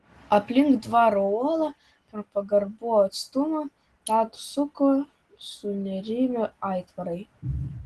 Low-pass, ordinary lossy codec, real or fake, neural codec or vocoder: 10.8 kHz; Opus, 16 kbps; real; none